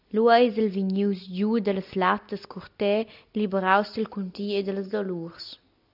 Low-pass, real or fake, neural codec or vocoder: 5.4 kHz; real; none